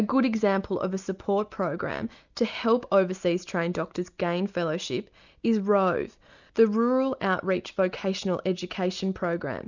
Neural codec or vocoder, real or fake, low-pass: none; real; 7.2 kHz